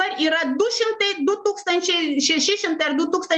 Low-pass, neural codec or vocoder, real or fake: 9.9 kHz; none; real